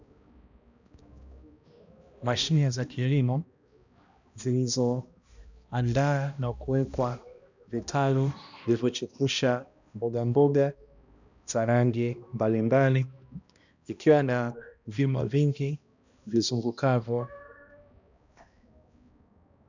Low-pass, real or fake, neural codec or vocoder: 7.2 kHz; fake; codec, 16 kHz, 1 kbps, X-Codec, HuBERT features, trained on balanced general audio